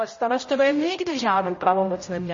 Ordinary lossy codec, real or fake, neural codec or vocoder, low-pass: MP3, 32 kbps; fake; codec, 16 kHz, 0.5 kbps, X-Codec, HuBERT features, trained on general audio; 7.2 kHz